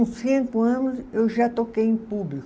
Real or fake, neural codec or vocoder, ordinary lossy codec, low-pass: real; none; none; none